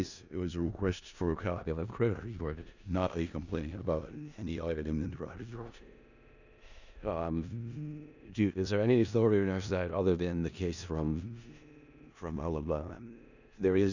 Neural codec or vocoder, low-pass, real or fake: codec, 16 kHz in and 24 kHz out, 0.4 kbps, LongCat-Audio-Codec, four codebook decoder; 7.2 kHz; fake